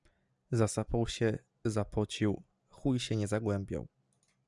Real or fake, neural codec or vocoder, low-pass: real; none; 10.8 kHz